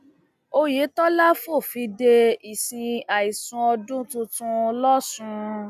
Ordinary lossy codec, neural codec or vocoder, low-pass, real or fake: none; none; 14.4 kHz; real